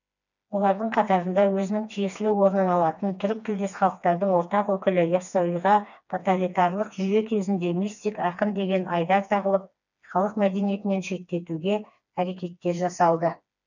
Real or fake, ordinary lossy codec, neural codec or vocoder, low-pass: fake; none; codec, 16 kHz, 2 kbps, FreqCodec, smaller model; 7.2 kHz